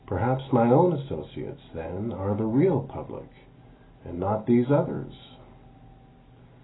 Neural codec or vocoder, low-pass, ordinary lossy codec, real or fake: none; 7.2 kHz; AAC, 16 kbps; real